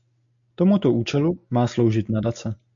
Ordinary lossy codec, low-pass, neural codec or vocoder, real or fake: AAC, 64 kbps; 7.2 kHz; none; real